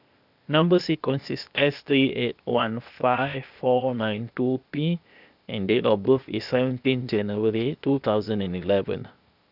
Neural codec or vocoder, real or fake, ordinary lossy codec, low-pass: codec, 16 kHz, 0.8 kbps, ZipCodec; fake; none; 5.4 kHz